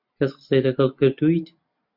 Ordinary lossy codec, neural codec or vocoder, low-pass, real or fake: MP3, 48 kbps; none; 5.4 kHz; real